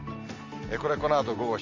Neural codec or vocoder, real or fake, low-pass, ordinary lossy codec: none; real; 7.2 kHz; Opus, 32 kbps